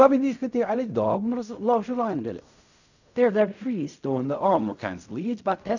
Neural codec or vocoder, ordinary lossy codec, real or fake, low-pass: codec, 16 kHz in and 24 kHz out, 0.4 kbps, LongCat-Audio-Codec, fine tuned four codebook decoder; none; fake; 7.2 kHz